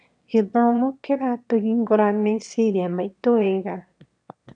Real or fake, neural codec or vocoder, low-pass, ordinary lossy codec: fake; autoencoder, 22.05 kHz, a latent of 192 numbers a frame, VITS, trained on one speaker; 9.9 kHz; none